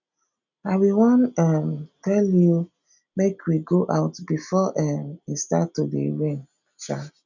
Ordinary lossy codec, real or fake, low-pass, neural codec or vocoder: none; real; 7.2 kHz; none